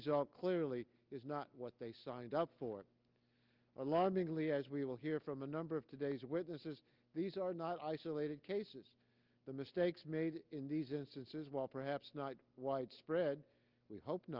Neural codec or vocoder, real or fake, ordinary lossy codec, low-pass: none; real; Opus, 24 kbps; 5.4 kHz